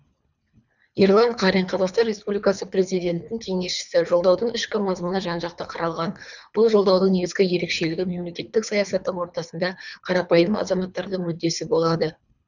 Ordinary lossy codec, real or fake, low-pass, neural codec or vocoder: none; fake; 7.2 kHz; codec, 24 kHz, 3 kbps, HILCodec